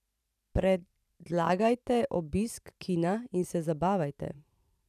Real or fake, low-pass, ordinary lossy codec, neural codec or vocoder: fake; 14.4 kHz; none; vocoder, 44.1 kHz, 128 mel bands every 512 samples, BigVGAN v2